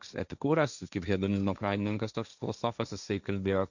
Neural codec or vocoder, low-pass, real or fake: codec, 16 kHz, 1.1 kbps, Voila-Tokenizer; 7.2 kHz; fake